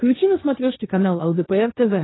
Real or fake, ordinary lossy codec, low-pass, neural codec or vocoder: fake; AAC, 16 kbps; 7.2 kHz; codec, 16 kHz, 1.1 kbps, Voila-Tokenizer